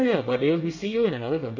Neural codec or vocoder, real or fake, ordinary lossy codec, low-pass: codec, 24 kHz, 1 kbps, SNAC; fake; none; 7.2 kHz